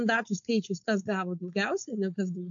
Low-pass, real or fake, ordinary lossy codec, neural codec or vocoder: 7.2 kHz; fake; MP3, 64 kbps; codec, 16 kHz, 4.8 kbps, FACodec